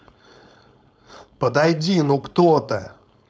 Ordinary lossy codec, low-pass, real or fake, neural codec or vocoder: none; none; fake; codec, 16 kHz, 4.8 kbps, FACodec